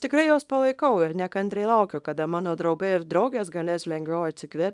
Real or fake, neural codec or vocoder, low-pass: fake; codec, 24 kHz, 0.9 kbps, WavTokenizer, small release; 10.8 kHz